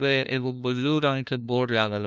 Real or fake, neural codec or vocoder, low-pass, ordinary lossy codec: fake; codec, 16 kHz, 1 kbps, FunCodec, trained on LibriTTS, 50 frames a second; none; none